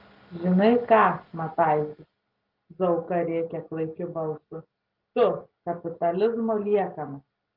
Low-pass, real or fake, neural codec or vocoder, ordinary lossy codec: 5.4 kHz; real; none; Opus, 32 kbps